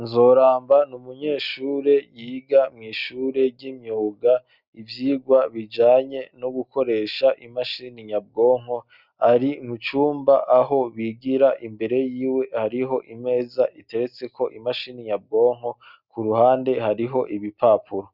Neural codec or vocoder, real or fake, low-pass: none; real; 5.4 kHz